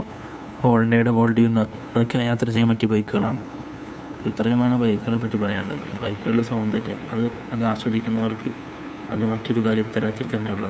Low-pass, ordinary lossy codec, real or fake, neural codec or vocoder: none; none; fake; codec, 16 kHz, 2 kbps, FunCodec, trained on LibriTTS, 25 frames a second